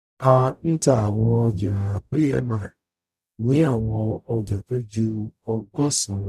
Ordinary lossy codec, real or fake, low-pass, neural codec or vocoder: none; fake; 14.4 kHz; codec, 44.1 kHz, 0.9 kbps, DAC